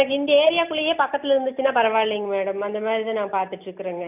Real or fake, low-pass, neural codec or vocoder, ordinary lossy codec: real; 3.6 kHz; none; none